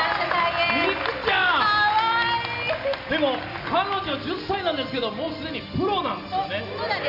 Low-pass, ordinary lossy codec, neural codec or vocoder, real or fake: 5.4 kHz; none; none; real